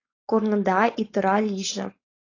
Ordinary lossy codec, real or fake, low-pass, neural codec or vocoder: AAC, 32 kbps; fake; 7.2 kHz; codec, 16 kHz, 4.8 kbps, FACodec